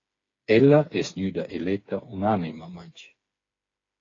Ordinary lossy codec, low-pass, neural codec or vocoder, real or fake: AAC, 32 kbps; 7.2 kHz; codec, 16 kHz, 4 kbps, FreqCodec, smaller model; fake